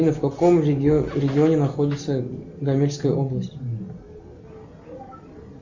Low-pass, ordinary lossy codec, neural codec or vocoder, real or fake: 7.2 kHz; Opus, 64 kbps; none; real